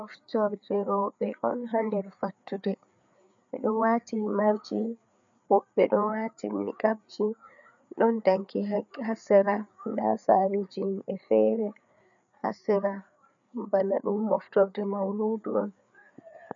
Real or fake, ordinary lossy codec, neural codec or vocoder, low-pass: fake; MP3, 96 kbps; codec, 16 kHz, 4 kbps, FreqCodec, larger model; 7.2 kHz